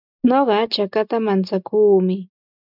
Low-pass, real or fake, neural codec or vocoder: 5.4 kHz; real; none